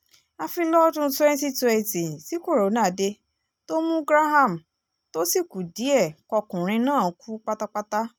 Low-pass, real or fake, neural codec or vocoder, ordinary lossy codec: none; real; none; none